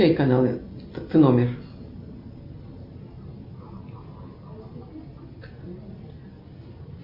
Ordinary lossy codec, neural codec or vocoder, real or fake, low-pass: MP3, 32 kbps; none; real; 5.4 kHz